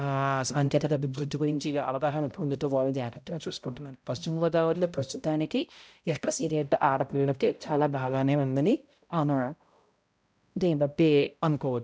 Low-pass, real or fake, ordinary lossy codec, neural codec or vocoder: none; fake; none; codec, 16 kHz, 0.5 kbps, X-Codec, HuBERT features, trained on balanced general audio